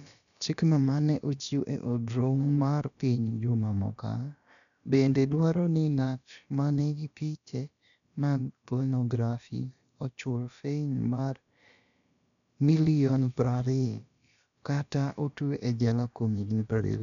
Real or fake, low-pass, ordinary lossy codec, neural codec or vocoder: fake; 7.2 kHz; none; codec, 16 kHz, about 1 kbps, DyCAST, with the encoder's durations